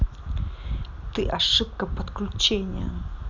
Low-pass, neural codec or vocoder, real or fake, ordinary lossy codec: 7.2 kHz; none; real; none